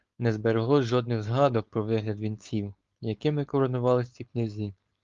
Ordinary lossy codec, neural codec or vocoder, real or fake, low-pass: Opus, 32 kbps; codec, 16 kHz, 4.8 kbps, FACodec; fake; 7.2 kHz